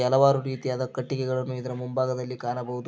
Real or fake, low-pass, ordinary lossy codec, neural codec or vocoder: real; none; none; none